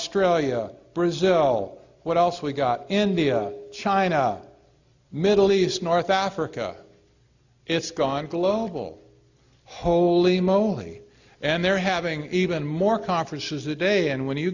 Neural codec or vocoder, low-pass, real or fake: none; 7.2 kHz; real